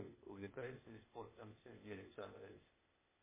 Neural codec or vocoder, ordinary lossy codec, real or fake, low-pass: codec, 16 kHz, 0.8 kbps, ZipCodec; MP3, 16 kbps; fake; 3.6 kHz